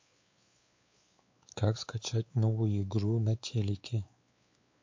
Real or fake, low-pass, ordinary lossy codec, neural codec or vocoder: fake; 7.2 kHz; MP3, 48 kbps; codec, 16 kHz, 4 kbps, X-Codec, WavLM features, trained on Multilingual LibriSpeech